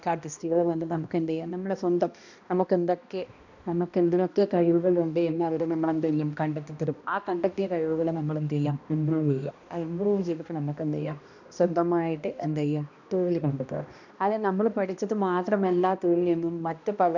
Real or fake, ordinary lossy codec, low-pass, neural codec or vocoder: fake; none; 7.2 kHz; codec, 16 kHz, 1 kbps, X-Codec, HuBERT features, trained on balanced general audio